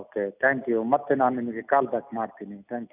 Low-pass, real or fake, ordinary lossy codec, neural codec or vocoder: 3.6 kHz; real; none; none